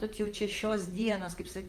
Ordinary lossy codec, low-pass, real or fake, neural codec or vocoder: Opus, 32 kbps; 14.4 kHz; fake; vocoder, 44.1 kHz, 128 mel bands, Pupu-Vocoder